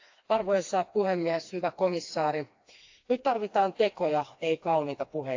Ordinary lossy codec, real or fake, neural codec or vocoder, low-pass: AAC, 48 kbps; fake; codec, 16 kHz, 2 kbps, FreqCodec, smaller model; 7.2 kHz